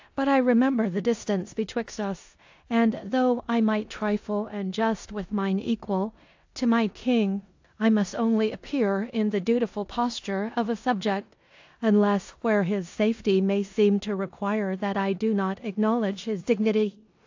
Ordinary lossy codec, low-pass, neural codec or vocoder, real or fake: AAC, 48 kbps; 7.2 kHz; codec, 16 kHz in and 24 kHz out, 0.9 kbps, LongCat-Audio-Codec, four codebook decoder; fake